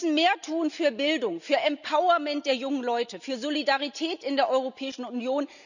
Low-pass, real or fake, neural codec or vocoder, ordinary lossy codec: 7.2 kHz; real; none; none